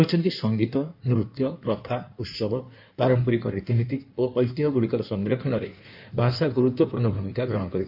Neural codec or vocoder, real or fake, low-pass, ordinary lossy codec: codec, 16 kHz in and 24 kHz out, 1.1 kbps, FireRedTTS-2 codec; fake; 5.4 kHz; none